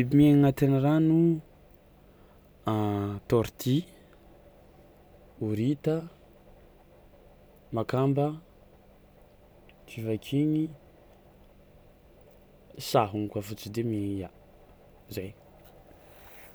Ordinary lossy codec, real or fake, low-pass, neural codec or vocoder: none; real; none; none